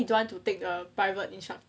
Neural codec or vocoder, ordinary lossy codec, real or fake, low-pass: none; none; real; none